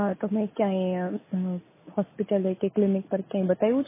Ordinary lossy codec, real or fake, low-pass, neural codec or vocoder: MP3, 16 kbps; real; 3.6 kHz; none